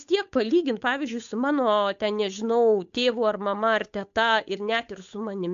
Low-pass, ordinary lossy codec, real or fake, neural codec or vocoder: 7.2 kHz; AAC, 64 kbps; fake; codec, 16 kHz, 8 kbps, FunCodec, trained on Chinese and English, 25 frames a second